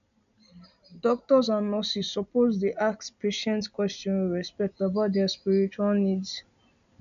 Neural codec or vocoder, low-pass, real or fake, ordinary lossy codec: none; 7.2 kHz; real; none